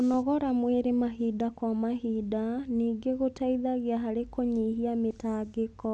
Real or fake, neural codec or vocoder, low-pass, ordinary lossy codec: real; none; none; none